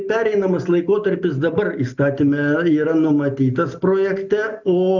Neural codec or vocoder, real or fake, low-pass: none; real; 7.2 kHz